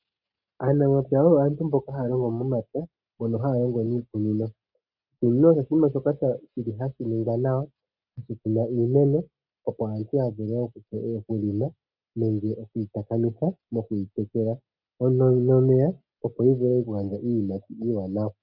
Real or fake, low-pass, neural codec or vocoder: real; 5.4 kHz; none